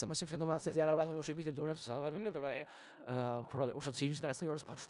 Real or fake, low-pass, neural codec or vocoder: fake; 10.8 kHz; codec, 16 kHz in and 24 kHz out, 0.4 kbps, LongCat-Audio-Codec, four codebook decoder